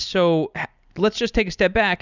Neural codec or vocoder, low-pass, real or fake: none; 7.2 kHz; real